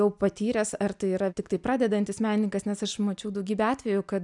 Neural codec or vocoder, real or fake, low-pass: none; real; 10.8 kHz